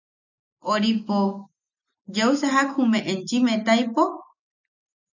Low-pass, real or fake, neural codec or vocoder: 7.2 kHz; real; none